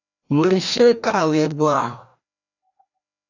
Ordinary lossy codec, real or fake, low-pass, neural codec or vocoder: AAC, 48 kbps; fake; 7.2 kHz; codec, 16 kHz, 1 kbps, FreqCodec, larger model